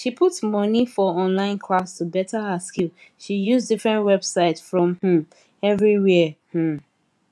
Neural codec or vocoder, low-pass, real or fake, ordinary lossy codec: vocoder, 24 kHz, 100 mel bands, Vocos; none; fake; none